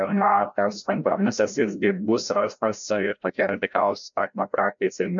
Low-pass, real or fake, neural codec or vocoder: 7.2 kHz; fake; codec, 16 kHz, 0.5 kbps, FreqCodec, larger model